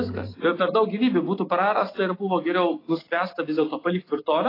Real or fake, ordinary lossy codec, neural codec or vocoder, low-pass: real; AAC, 24 kbps; none; 5.4 kHz